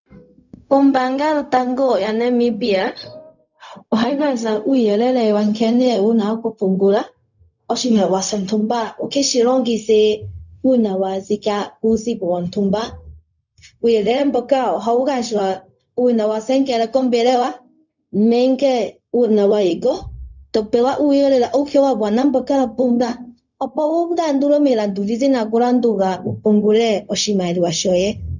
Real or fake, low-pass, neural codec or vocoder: fake; 7.2 kHz; codec, 16 kHz, 0.4 kbps, LongCat-Audio-Codec